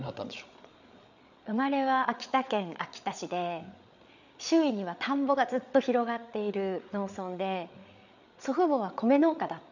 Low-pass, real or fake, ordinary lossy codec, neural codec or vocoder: 7.2 kHz; fake; none; codec, 16 kHz, 8 kbps, FreqCodec, larger model